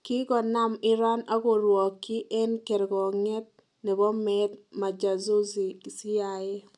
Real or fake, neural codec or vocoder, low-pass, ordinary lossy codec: real; none; 10.8 kHz; none